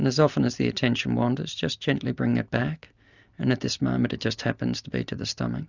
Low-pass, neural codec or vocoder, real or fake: 7.2 kHz; none; real